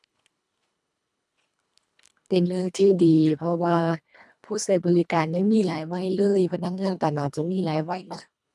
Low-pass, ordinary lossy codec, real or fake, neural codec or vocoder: none; none; fake; codec, 24 kHz, 1.5 kbps, HILCodec